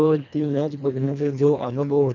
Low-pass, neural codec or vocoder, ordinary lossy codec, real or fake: 7.2 kHz; codec, 24 kHz, 1.5 kbps, HILCodec; none; fake